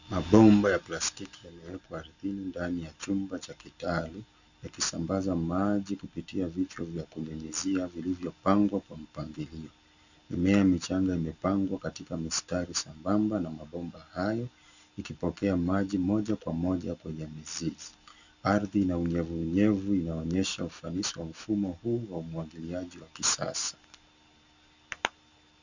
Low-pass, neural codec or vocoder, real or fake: 7.2 kHz; none; real